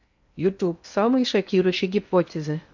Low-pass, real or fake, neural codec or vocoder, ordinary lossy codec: 7.2 kHz; fake; codec, 16 kHz in and 24 kHz out, 0.6 kbps, FocalCodec, streaming, 2048 codes; none